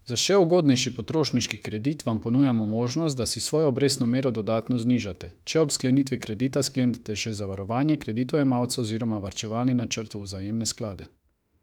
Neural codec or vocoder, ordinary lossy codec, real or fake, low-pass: autoencoder, 48 kHz, 32 numbers a frame, DAC-VAE, trained on Japanese speech; none; fake; 19.8 kHz